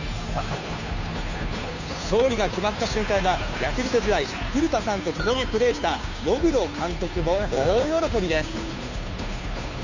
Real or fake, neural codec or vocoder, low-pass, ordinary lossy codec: fake; codec, 16 kHz, 2 kbps, FunCodec, trained on Chinese and English, 25 frames a second; 7.2 kHz; none